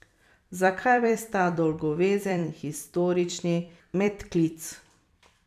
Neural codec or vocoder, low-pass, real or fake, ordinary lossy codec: vocoder, 44.1 kHz, 128 mel bands every 256 samples, BigVGAN v2; 14.4 kHz; fake; none